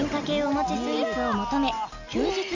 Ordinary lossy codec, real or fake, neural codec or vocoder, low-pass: none; real; none; 7.2 kHz